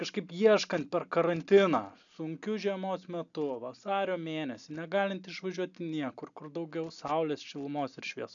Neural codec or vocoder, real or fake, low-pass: none; real; 7.2 kHz